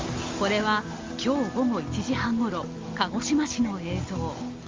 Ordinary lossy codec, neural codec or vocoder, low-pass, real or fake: Opus, 32 kbps; none; 7.2 kHz; real